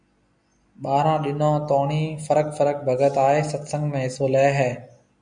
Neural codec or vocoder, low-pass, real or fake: none; 9.9 kHz; real